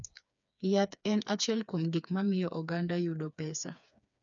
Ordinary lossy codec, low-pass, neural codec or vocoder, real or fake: none; 7.2 kHz; codec, 16 kHz, 4 kbps, FreqCodec, smaller model; fake